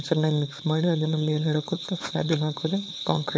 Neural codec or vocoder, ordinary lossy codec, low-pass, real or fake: codec, 16 kHz, 4.8 kbps, FACodec; none; none; fake